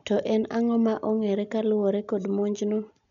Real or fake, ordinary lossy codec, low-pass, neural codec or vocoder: real; none; 7.2 kHz; none